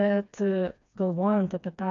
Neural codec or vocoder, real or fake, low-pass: codec, 16 kHz, 2 kbps, FreqCodec, smaller model; fake; 7.2 kHz